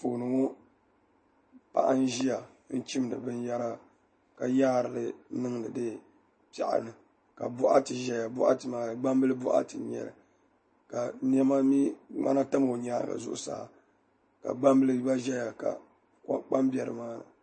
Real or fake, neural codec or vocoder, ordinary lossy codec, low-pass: real; none; MP3, 32 kbps; 9.9 kHz